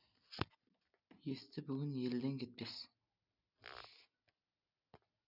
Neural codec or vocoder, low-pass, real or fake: none; 5.4 kHz; real